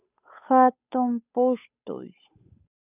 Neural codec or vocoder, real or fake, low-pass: codec, 16 kHz, 8 kbps, FunCodec, trained on Chinese and English, 25 frames a second; fake; 3.6 kHz